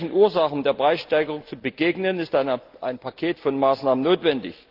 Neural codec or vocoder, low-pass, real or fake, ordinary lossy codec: none; 5.4 kHz; real; Opus, 32 kbps